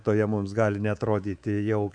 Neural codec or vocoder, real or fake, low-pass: codec, 24 kHz, 3.1 kbps, DualCodec; fake; 9.9 kHz